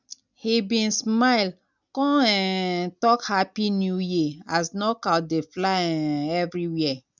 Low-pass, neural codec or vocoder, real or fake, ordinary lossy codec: 7.2 kHz; none; real; none